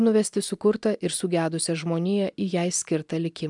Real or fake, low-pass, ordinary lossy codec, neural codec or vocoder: real; 10.8 kHz; MP3, 96 kbps; none